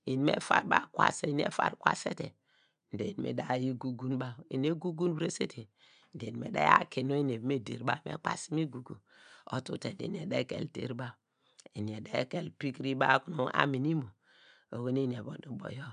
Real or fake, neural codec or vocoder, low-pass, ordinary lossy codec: real; none; 9.9 kHz; none